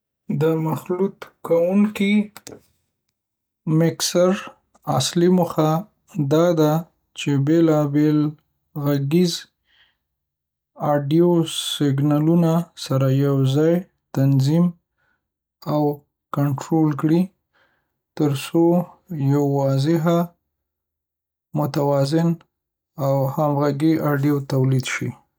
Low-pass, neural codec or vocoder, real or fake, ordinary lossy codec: none; none; real; none